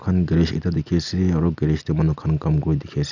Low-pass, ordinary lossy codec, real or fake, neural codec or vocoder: 7.2 kHz; none; real; none